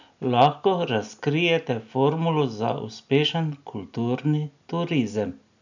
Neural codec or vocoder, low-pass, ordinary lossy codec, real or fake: none; 7.2 kHz; none; real